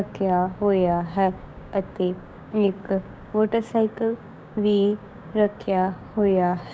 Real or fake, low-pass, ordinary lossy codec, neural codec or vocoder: fake; none; none; codec, 16 kHz, 6 kbps, DAC